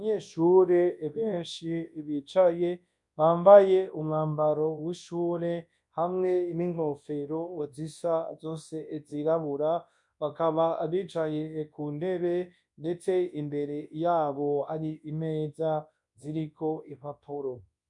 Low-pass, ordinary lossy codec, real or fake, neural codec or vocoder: 10.8 kHz; MP3, 64 kbps; fake; codec, 24 kHz, 0.9 kbps, WavTokenizer, large speech release